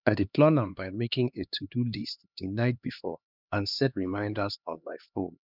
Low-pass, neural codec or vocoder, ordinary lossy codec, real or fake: 5.4 kHz; codec, 16 kHz, 2 kbps, X-Codec, HuBERT features, trained on LibriSpeech; none; fake